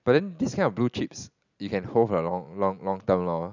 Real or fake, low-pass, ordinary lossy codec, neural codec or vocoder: real; 7.2 kHz; none; none